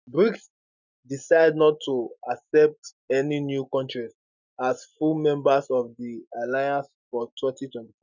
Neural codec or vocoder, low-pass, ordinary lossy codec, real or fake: none; 7.2 kHz; none; real